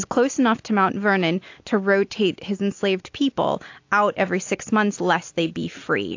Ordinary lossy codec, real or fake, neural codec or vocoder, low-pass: AAC, 48 kbps; real; none; 7.2 kHz